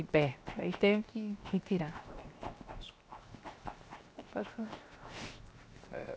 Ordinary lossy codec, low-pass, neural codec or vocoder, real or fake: none; none; codec, 16 kHz, 0.7 kbps, FocalCodec; fake